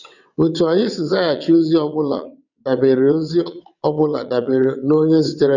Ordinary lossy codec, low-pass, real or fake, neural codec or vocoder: none; 7.2 kHz; fake; vocoder, 22.05 kHz, 80 mel bands, WaveNeXt